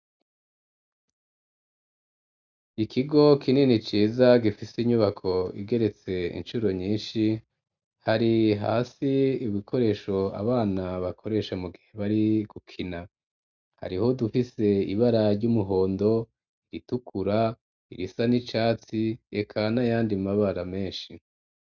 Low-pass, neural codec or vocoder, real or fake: 7.2 kHz; none; real